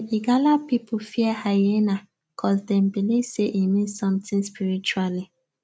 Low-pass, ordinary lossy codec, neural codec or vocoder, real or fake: none; none; none; real